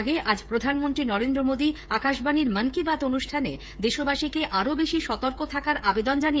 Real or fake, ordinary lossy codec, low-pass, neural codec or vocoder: fake; none; none; codec, 16 kHz, 8 kbps, FreqCodec, smaller model